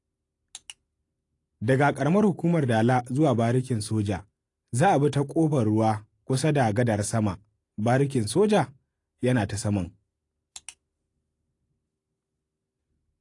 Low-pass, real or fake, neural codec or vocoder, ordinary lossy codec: 10.8 kHz; real; none; AAC, 48 kbps